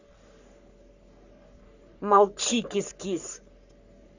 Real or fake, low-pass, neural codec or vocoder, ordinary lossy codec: fake; 7.2 kHz; codec, 44.1 kHz, 3.4 kbps, Pupu-Codec; none